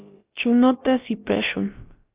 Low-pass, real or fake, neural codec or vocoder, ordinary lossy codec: 3.6 kHz; fake; codec, 16 kHz, about 1 kbps, DyCAST, with the encoder's durations; Opus, 24 kbps